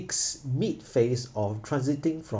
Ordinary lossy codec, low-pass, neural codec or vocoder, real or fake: none; none; none; real